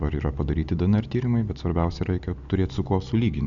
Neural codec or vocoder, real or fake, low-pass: none; real; 7.2 kHz